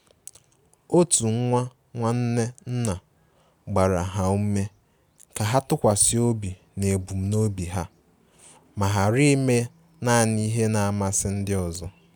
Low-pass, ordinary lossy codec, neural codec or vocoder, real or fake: none; none; none; real